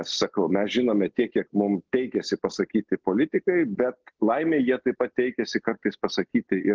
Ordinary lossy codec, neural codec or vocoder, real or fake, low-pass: Opus, 16 kbps; none; real; 7.2 kHz